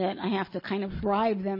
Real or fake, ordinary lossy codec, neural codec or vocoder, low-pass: real; MP3, 24 kbps; none; 5.4 kHz